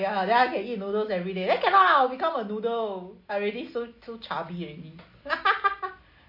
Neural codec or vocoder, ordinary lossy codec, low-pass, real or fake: none; MP3, 32 kbps; 5.4 kHz; real